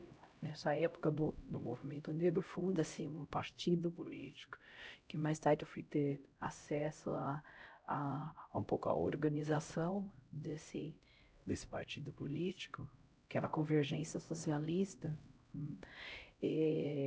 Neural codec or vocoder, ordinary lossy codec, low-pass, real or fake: codec, 16 kHz, 0.5 kbps, X-Codec, HuBERT features, trained on LibriSpeech; none; none; fake